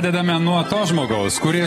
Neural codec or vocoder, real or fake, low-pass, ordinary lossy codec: none; real; 19.8 kHz; AAC, 32 kbps